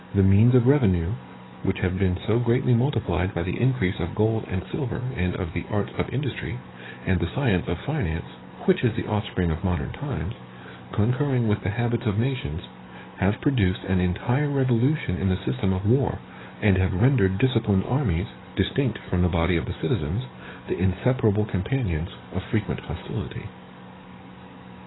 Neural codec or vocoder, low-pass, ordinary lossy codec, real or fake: codec, 44.1 kHz, 7.8 kbps, DAC; 7.2 kHz; AAC, 16 kbps; fake